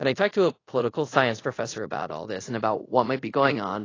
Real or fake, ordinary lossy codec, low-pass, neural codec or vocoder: fake; AAC, 32 kbps; 7.2 kHz; codec, 24 kHz, 0.5 kbps, DualCodec